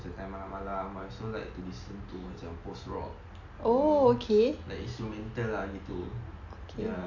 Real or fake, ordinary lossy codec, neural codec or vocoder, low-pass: real; none; none; 7.2 kHz